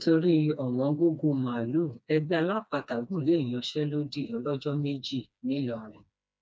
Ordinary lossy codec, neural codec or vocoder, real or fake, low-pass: none; codec, 16 kHz, 2 kbps, FreqCodec, smaller model; fake; none